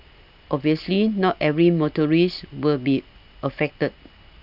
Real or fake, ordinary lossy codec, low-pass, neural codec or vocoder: real; none; 5.4 kHz; none